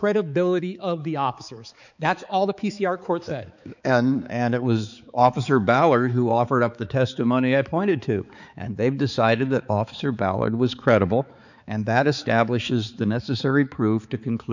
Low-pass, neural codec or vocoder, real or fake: 7.2 kHz; codec, 16 kHz, 4 kbps, X-Codec, HuBERT features, trained on balanced general audio; fake